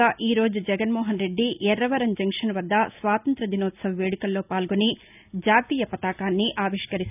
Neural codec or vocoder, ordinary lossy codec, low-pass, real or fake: none; none; 3.6 kHz; real